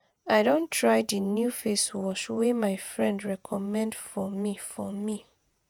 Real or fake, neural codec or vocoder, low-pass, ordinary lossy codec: fake; vocoder, 48 kHz, 128 mel bands, Vocos; none; none